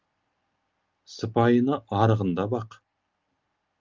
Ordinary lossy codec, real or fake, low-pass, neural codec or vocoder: Opus, 24 kbps; real; 7.2 kHz; none